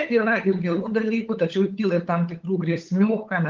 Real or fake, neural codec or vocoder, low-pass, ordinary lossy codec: fake; codec, 16 kHz, 8 kbps, FunCodec, trained on LibriTTS, 25 frames a second; 7.2 kHz; Opus, 32 kbps